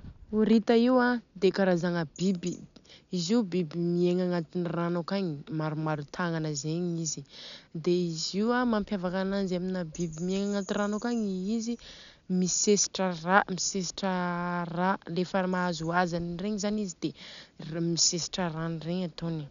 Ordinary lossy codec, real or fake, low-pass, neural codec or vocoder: none; real; 7.2 kHz; none